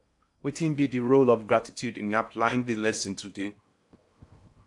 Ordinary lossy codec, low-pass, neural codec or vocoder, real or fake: AAC, 64 kbps; 10.8 kHz; codec, 16 kHz in and 24 kHz out, 0.8 kbps, FocalCodec, streaming, 65536 codes; fake